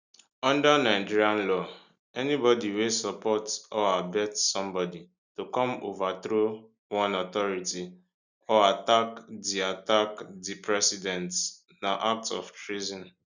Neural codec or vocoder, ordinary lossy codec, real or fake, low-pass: none; none; real; 7.2 kHz